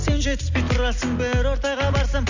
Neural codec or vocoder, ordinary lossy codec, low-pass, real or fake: none; Opus, 64 kbps; 7.2 kHz; real